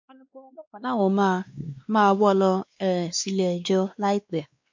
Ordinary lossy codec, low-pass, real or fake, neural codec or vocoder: MP3, 48 kbps; 7.2 kHz; fake; codec, 16 kHz, 2 kbps, X-Codec, WavLM features, trained on Multilingual LibriSpeech